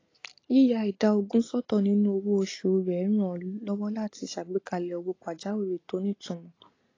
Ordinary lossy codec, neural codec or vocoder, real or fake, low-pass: AAC, 32 kbps; none; real; 7.2 kHz